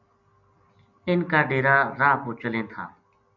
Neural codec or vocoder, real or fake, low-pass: none; real; 7.2 kHz